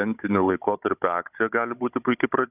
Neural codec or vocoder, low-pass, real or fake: codec, 16 kHz, 16 kbps, FunCodec, trained on Chinese and English, 50 frames a second; 3.6 kHz; fake